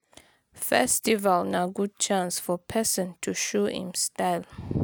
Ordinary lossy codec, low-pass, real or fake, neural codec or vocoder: none; none; real; none